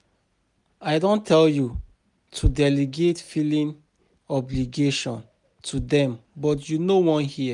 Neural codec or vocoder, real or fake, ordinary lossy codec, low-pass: none; real; none; 10.8 kHz